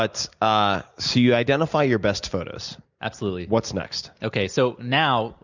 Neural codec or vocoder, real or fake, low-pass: none; real; 7.2 kHz